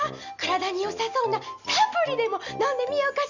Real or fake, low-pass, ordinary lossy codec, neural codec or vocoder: real; 7.2 kHz; none; none